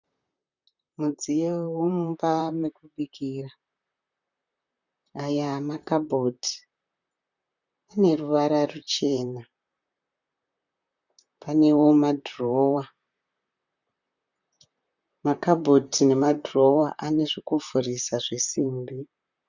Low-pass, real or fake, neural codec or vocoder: 7.2 kHz; fake; vocoder, 44.1 kHz, 128 mel bands, Pupu-Vocoder